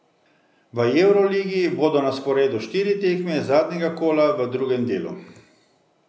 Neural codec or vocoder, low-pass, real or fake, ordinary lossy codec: none; none; real; none